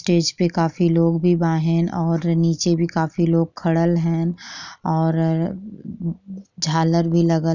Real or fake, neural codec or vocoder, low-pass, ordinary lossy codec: real; none; 7.2 kHz; none